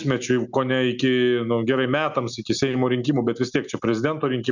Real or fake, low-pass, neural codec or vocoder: real; 7.2 kHz; none